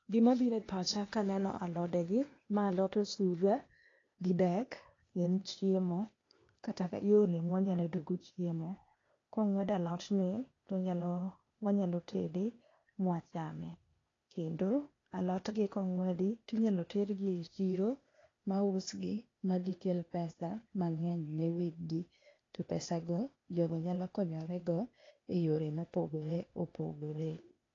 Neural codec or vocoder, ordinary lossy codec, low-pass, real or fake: codec, 16 kHz, 0.8 kbps, ZipCodec; AAC, 32 kbps; 7.2 kHz; fake